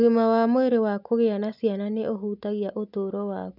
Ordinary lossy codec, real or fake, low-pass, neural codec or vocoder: none; real; 5.4 kHz; none